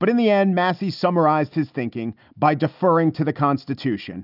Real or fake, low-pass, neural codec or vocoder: real; 5.4 kHz; none